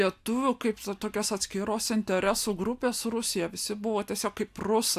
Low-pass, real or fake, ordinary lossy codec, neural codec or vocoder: 14.4 kHz; real; AAC, 96 kbps; none